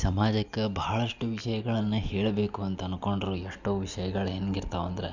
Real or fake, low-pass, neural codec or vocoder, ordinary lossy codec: real; 7.2 kHz; none; none